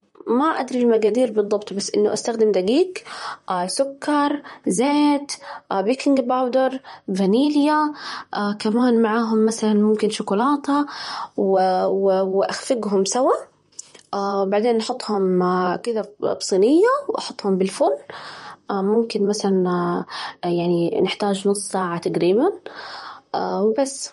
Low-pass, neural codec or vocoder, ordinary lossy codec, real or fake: 19.8 kHz; vocoder, 44.1 kHz, 128 mel bands, Pupu-Vocoder; MP3, 48 kbps; fake